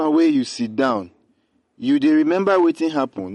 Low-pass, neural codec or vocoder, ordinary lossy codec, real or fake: 19.8 kHz; none; MP3, 48 kbps; real